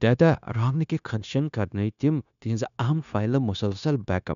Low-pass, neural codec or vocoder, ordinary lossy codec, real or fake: 7.2 kHz; codec, 16 kHz, 0.9 kbps, LongCat-Audio-Codec; none; fake